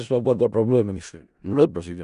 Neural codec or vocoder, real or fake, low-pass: codec, 16 kHz in and 24 kHz out, 0.4 kbps, LongCat-Audio-Codec, four codebook decoder; fake; 10.8 kHz